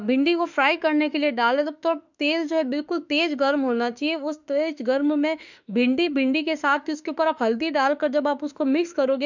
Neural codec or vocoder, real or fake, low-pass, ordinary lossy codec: autoencoder, 48 kHz, 32 numbers a frame, DAC-VAE, trained on Japanese speech; fake; 7.2 kHz; none